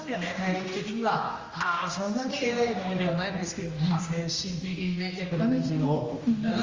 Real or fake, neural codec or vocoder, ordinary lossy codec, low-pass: fake; codec, 16 kHz, 1 kbps, X-Codec, HuBERT features, trained on general audio; Opus, 32 kbps; 7.2 kHz